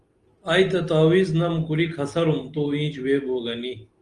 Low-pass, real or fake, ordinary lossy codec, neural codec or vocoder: 10.8 kHz; real; Opus, 24 kbps; none